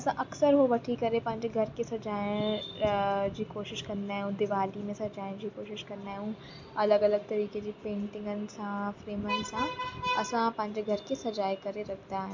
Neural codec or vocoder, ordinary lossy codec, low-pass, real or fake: none; MP3, 64 kbps; 7.2 kHz; real